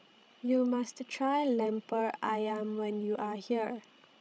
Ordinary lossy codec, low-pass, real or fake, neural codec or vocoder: none; none; fake; codec, 16 kHz, 16 kbps, FreqCodec, larger model